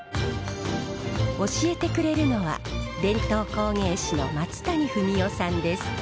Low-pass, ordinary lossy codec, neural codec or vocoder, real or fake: none; none; none; real